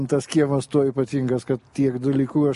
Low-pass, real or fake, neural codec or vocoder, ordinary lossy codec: 14.4 kHz; real; none; MP3, 48 kbps